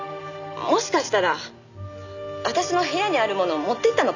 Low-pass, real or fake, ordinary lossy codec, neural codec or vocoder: 7.2 kHz; real; none; none